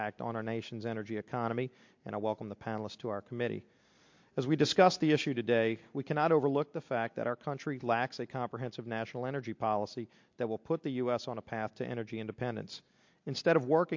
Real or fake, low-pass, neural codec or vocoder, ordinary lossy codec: real; 7.2 kHz; none; MP3, 48 kbps